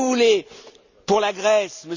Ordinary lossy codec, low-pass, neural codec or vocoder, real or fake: Opus, 64 kbps; 7.2 kHz; none; real